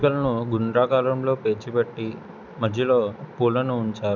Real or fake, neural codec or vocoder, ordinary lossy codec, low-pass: real; none; none; 7.2 kHz